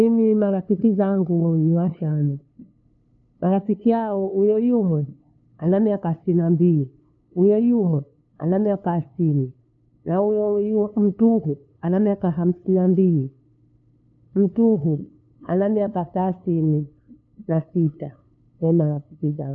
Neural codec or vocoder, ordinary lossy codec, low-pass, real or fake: codec, 16 kHz, 2 kbps, FunCodec, trained on LibriTTS, 25 frames a second; none; 7.2 kHz; fake